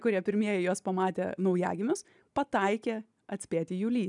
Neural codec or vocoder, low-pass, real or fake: none; 10.8 kHz; real